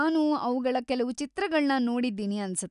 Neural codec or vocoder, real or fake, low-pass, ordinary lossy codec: none; real; 10.8 kHz; none